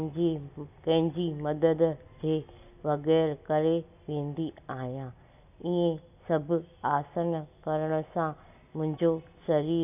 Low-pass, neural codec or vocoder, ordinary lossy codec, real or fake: 3.6 kHz; none; none; real